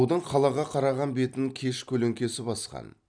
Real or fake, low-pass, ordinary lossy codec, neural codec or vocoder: fake; 9.9 kHz; none; vocoder, 44.1 kHz, 128 mel bands every 256 samples, BigVGAN v2